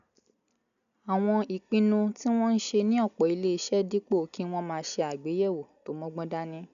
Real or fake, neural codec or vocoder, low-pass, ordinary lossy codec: real; none; 7.2 kHz; none